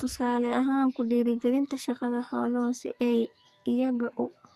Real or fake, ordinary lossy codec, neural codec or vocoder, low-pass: fake; Opus, 64 kbps; codec, 44.1 kHz, 2.6 kbps, SNAC; 14.4 kHz